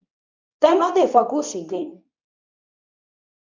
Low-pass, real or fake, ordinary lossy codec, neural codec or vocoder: 7.2 kHz; fake; MP3, 64 kbps; codec, 24 kHz, 0.9 kbps, WavTokenizer, medium speech release version 2